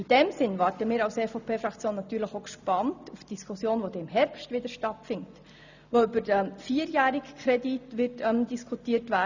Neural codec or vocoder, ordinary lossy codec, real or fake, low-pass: none; none; real; 7.2 kHz